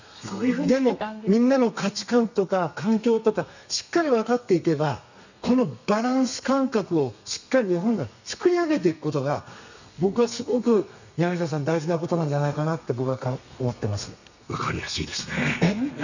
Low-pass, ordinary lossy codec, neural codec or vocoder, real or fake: 7.2 kHz; none; codec, 32 kHz, 1.9 kbps, SNAC; fake